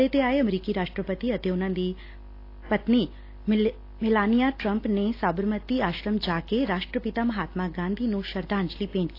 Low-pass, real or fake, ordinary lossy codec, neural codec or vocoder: 5.4 kHz; real; AAC, 32 kbps; none